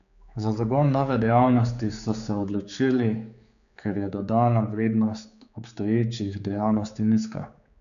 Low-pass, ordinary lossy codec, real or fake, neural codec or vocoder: 7.2 kHz; none; fake; codec, 16 kHz, 4 kbps, X-Codec, HuBERT features, trained on general audio